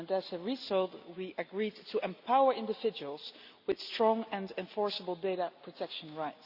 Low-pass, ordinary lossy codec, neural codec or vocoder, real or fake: 5.4 kHz; Opus, 64 kbps; none; real